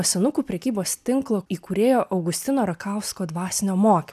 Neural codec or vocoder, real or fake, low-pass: none; real; 14.4 kHz